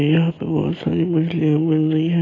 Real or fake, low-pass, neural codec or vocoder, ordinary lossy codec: real; 7.2 kHz; none; AAC, 32 kbps